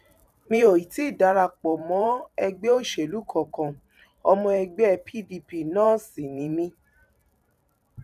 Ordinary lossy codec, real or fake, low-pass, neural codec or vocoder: none; fake; 14.4 kHz; vocoder, 44.1 kHz, 128 mel bands every 512 samples, BigVGAN v2